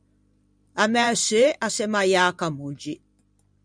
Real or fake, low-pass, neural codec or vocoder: fake; 9.9 kHz; vocoder, 44.1 kHz, 128 mel bands every 512 samples, BigVGAN v2